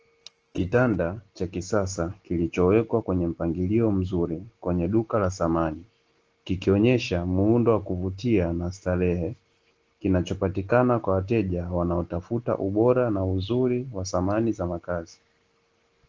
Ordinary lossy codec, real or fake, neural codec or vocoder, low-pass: Opus, 16 kbps; real; none; 7.2 kHz